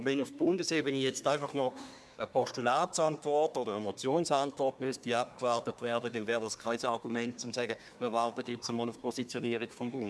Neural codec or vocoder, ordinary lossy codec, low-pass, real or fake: codec, 24 kHz, 1 kbps, SNAC; none; none; fake